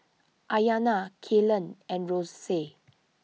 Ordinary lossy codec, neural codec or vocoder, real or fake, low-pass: none; none; real; none